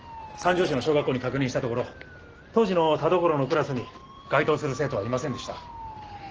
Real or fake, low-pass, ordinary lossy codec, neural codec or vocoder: real; 7.2 kHz; Opus, 16 kbps; none